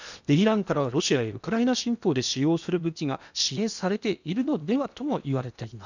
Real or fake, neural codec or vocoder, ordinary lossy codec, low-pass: fake; codec, 16 kHz in and 24 kHz out, 0.8 kbps, FocalCodec, streaming, 65536 codes; none; 7.2 kHz